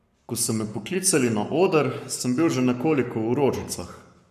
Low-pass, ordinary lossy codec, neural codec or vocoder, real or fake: 14.4 kHz; MP3, 96 kbps; codec, 44.1 kHz, 7.8 kbps, Pupu-Codec; fake